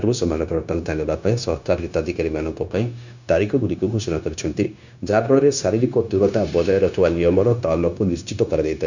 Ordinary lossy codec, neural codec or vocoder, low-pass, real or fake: none; codec, 16 kHz, 0.9 kbps, LongCat-Audio-Codec; 7.2 kHz; fake